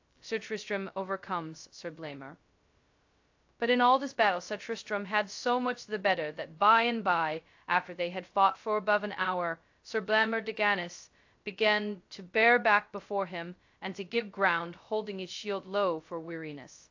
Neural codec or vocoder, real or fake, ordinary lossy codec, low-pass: codec, 16 kHz, 0.2 kbps, FocalCodec; fake; AAC, 48 kbps; 7.2 kHz